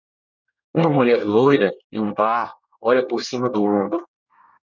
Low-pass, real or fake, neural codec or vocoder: 7.2 kHz; fake; codec, 24 kHz, 1 kbps, SNAC